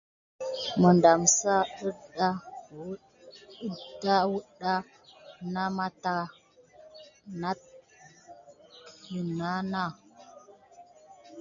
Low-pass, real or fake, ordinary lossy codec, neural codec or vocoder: 7.2 kHz; real; MP3, 96 kbps; none